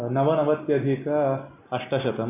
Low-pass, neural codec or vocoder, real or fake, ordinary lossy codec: 3.6 kHz; none; real; none